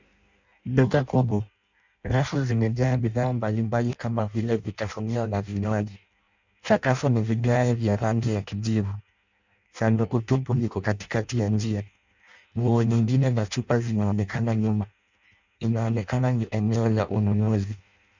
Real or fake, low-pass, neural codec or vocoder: fake; 7.2 kHz; codec, 16 kHz in and 24 kHz out, 0.6 kbps, FireRedTTS-2 codec